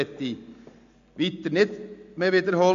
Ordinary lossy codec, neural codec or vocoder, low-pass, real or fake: MP3, 48 kbps; none; 7.2 kHz; real